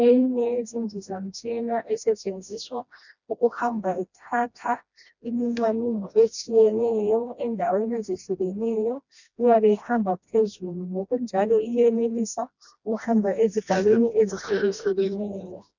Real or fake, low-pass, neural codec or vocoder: fake; 7.2 kHz; codec, 16 kHz, 1 kbps, FreqCodec, smaller model